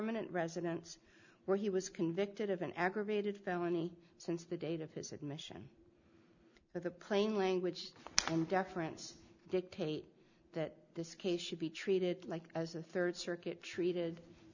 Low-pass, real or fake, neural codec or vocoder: 7.2 kHz; real; none